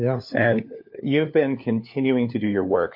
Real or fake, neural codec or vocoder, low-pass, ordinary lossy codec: fake; codec, 16 kHz, 4 kbps, FunCodec, trained on LibriTTS, 50 frames a second; 5.4 kHz; MP3, 32 kbps